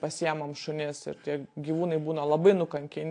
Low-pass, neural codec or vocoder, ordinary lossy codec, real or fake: 9.9 kHz; none; AAC, 48 kbps; real